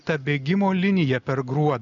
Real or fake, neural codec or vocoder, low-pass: real; none; 7.2 kHz